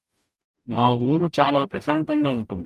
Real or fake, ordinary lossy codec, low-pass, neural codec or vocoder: fake; Opus, 32 kbps; 14.4 kHz; codec, 44.1 kHz, 0.9 kbps, DAC